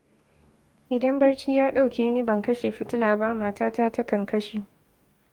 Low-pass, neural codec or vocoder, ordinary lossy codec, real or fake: 19.8 kHz; codec, 44.1 kHz, 2.6 kbps, DAC; Opus, 32 kbps; fake